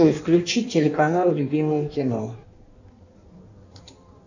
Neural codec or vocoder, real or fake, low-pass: codec, 16 kHz in and 24 kHz out, 1.1 kbps, FireRedTTS-2 codec; fake; 7.2 kHz